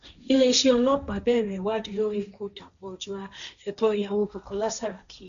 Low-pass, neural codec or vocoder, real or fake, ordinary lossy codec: 7.2 kHz; codec, 16 kHz, 1.1 kbps, Voila-Tokenizer; fake; none